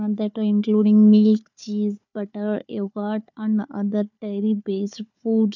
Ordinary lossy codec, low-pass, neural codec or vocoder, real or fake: none; 7.2 kHz; codec, 16 kHz, 4 kbps, FunCodec, trained on Chinese and English, 50 frames a second; fake